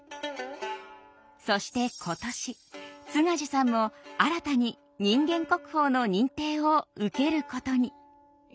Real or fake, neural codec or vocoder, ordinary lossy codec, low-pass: real; none; none; none